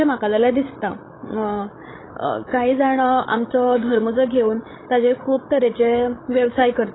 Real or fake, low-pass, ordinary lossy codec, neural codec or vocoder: fake; 7.2 kHz; AAC, 16 kbps; codec, 16 kHz, 16 kbps, FreqCodec, larger model